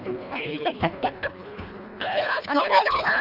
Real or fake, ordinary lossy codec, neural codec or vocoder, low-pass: fake; none; codec, 24 kHz, 1.5 kbps, HILCodec; 5.4 kHz